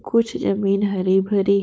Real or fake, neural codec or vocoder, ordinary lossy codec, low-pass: fake; codec, 16 kHz, 4.8 kbps, FACodec; none; none